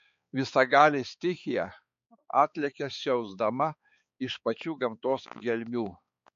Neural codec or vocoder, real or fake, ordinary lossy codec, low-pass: codec, 16 kHz, 4 kbps, X-Codec, HuBERT features, trained on balanced general audio; fake; MP3, 48 kbps; 7.2 kHz